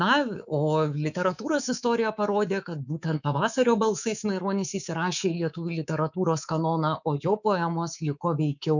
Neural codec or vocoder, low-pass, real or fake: codec, 24 kHz, 3.1 kbps, DualCodec; 7.2 kHz; fake